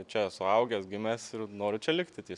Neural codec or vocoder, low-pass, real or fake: none; 10.8 kHz; real